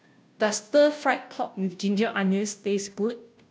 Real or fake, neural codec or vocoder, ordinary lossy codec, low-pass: fake; codec, 16 kHz, 0.5 kbps, FunCodec, trained on Chinese and English, 25 frames a second; none; none